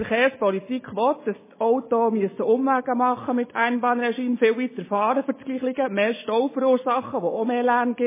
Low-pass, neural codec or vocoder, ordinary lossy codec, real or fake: 3.6 kHz; none; MP3, 16 kbps; real